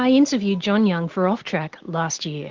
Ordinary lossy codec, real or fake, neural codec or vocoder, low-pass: Opus, 16 kbps; real; none; 7.2 kHz